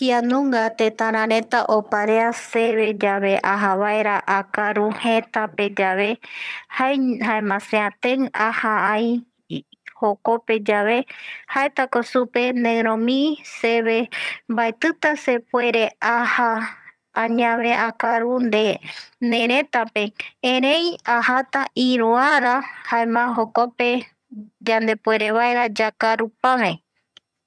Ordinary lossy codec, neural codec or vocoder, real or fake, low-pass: none; vocoder, 22.05 kHz, 80 mel bands, HiFi-GAN; fake; none